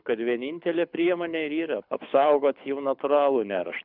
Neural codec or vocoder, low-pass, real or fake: codec, 24 kHz, 6 kbps, HILCodec; 5.4 kHz; fake